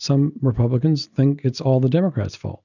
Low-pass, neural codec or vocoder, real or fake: 7.2 kHz; none; real